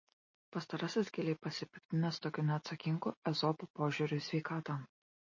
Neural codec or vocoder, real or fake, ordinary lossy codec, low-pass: none; real; MP3, 32 kbps; 7.2 kHz